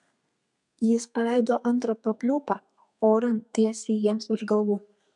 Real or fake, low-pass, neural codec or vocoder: fake; 10.8 kHz; codec, 32 kHz, 1.9 kbps, SNAC